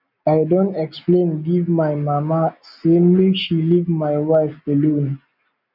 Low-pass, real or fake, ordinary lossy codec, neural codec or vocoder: 5.4 kHz; real; none; none